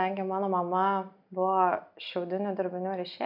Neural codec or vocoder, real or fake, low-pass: none; real; 5.4 kHz